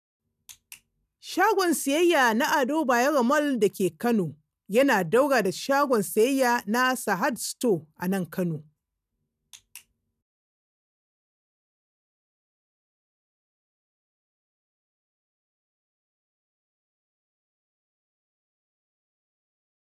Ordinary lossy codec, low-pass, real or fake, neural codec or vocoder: none; 14.4 kHz; real; none